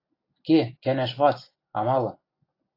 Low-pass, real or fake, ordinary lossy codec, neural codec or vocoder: 5.4 kHz; real; AAC, 32 kbps; none